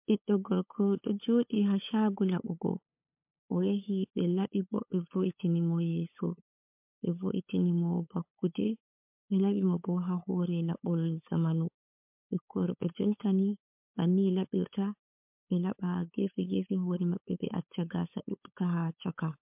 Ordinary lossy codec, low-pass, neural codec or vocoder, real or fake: MP3, 32 kbps; 3.6 kHz; codec, 16 kHz, 8 kbps, FunCodec, trained on LibriTTS, 25 frames a second; fake